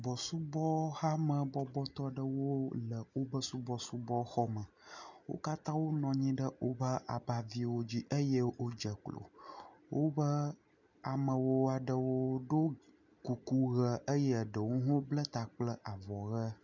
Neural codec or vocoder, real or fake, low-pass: none; real; 7.2 kHz